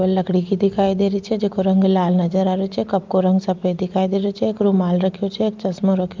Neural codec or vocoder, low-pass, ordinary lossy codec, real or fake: none; 7.2 kHz; Opus, 32 kbps; real